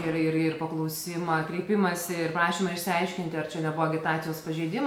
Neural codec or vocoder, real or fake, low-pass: none; real; 19.8 kHz